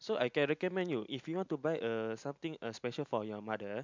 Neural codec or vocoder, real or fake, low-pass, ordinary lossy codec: none; real; 7.2 kHz; MP3, 64 kbps